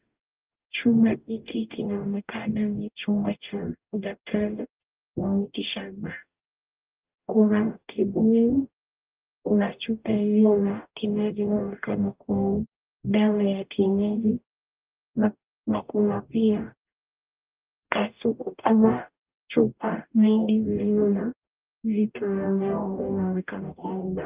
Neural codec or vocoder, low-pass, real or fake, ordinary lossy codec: codec, 44.1 kHz, 0.9 kbps, DAC; 3.6 kHz; fake; Opus, 24 kbps